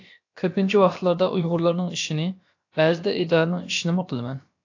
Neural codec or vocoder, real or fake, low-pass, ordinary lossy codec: codec, 16 kHz, about 1 kbps, DyCAST, with the encoder's durations; fake; 7.2 kHz; AAC, 48 kbps